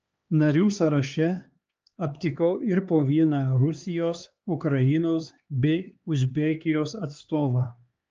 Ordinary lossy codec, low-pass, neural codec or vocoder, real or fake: Opus, 24 kbps; 7.2 kHz; codec, 16 kHz, 2 kbps, X-Codec, HuBERT features, trained on LibriSpeech; fake